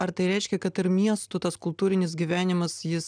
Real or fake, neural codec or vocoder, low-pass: real; none; 9.9 kHz